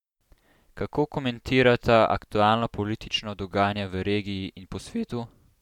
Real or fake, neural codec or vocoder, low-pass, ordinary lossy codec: real; none; 19.8 kHz; MP3, 96 kbps